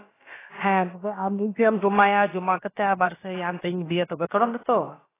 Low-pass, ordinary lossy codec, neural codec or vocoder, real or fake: 3.6 kHz; AAC, 16 kbps; codec, 16 kHz, about 1 kbps, DyCAST, with the encoder's durations; fake